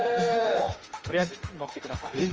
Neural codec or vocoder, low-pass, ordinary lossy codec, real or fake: autoencoder, 48 kHz, 32 numbers a frame, DAC-VAE, trained on Japanese speech; 7.2 kHz; Opus, 24 kbps; fake